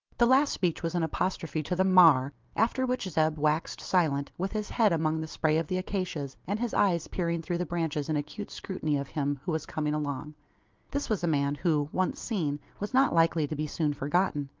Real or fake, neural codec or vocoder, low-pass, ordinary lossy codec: real; none; 7.2 kHz; Opus, 32 kbps